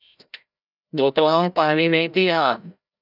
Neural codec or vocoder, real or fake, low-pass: codec, 16 kHz, 0.5 kbps, FreqCodec, larger model; fake; 5.4 kHz